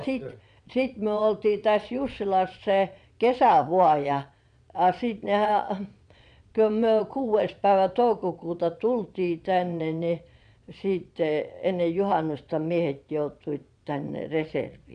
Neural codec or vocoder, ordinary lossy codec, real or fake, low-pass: vocoder, 22.05 kHz, 80 mel bands, Vocos; none; fake; 9.9 kHz